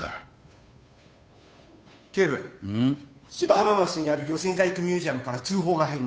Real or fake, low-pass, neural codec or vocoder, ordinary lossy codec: fake; none; codec, 16 kHz, 2 kbps, FunCodec, trained on Chinese and English, 25 frames a second; none